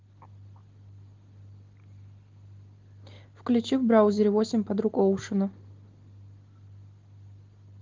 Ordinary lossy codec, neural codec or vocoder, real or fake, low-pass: Opus, 16 kbps; none; real; 7.2 kHz